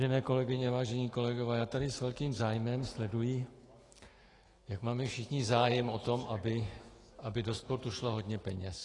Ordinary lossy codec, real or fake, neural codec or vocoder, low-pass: AAC, 32 kbps; fake; autoencoder, 48 kHz, 128 numbers a frame, DAC-VAE, trained on Japanese speech; 10.8 kHz